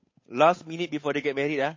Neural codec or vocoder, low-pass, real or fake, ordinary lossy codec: codec, 16 kHz, 8 kbps, FunCodec, trained on Chinese and English, 25 frames a second; 7.2 kHz; fake; MP3, 32 kbps